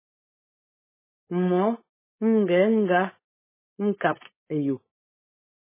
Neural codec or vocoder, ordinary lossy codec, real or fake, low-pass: codec, 16 kHz, 4.8 kbps, FACodec; MP3, 16 kbps; fake; 3.6 kHz